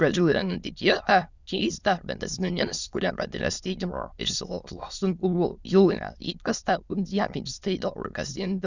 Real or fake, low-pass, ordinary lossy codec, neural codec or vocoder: fake; 7.2 kHz; Opus, 64 kbps; autoencoder, 22.05 kHz, a latent of 192 numbers a frame, VITS, trained on many speakers